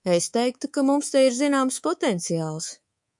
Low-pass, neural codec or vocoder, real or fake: 10.8 kHz; codec, 24 kHz, 3.1 kbps, DualCodec; fake